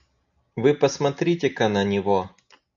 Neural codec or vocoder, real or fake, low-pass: none; real; 7.2 kHz